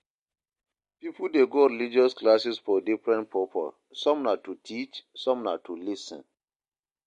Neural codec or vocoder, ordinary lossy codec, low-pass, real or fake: none; MP3, 48 kbps; 14.4 kHz; real